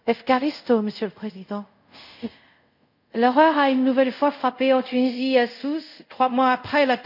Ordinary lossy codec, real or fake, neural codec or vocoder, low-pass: none; fake; codec, 24 kHz, 0.5 kbps, DualCodec; 5.4 kHz